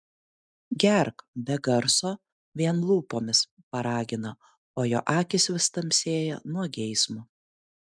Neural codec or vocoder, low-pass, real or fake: none; 9.9 kHz; real